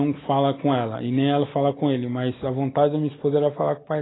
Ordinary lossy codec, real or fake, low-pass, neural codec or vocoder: AAC, 16 kbps; real; 7.2 kHz; none